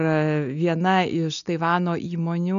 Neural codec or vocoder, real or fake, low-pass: none; real; 7.2 kHz